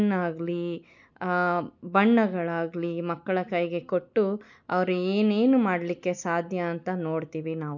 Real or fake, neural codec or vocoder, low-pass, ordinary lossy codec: real; none; 7.2 kHz; none